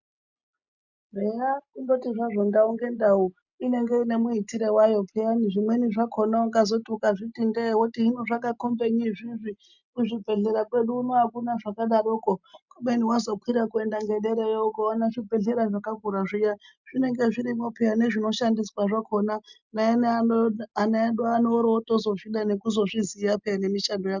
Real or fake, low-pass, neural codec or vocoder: real; 7.2 kHz; none